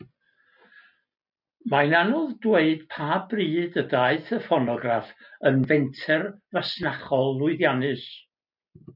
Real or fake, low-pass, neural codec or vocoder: real; 5.4 kHz; none